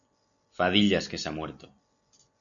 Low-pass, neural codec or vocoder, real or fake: 7.2 kHz; none; real